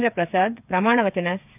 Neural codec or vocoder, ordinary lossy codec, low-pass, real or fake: codec, 16 kHz, 8 kbps, FreqCodec, smaller model; none; 3.6 kHz; fake